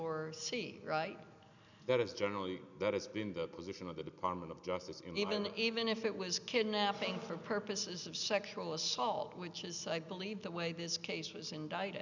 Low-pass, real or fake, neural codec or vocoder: 7.2 kHz; real; none